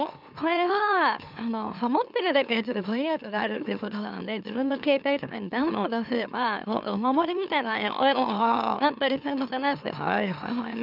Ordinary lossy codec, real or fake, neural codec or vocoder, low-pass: none; fake; autoencoder, 44.1 kHz, a latent of 192 numbers a frame, MeloTTS; 5.4 kHz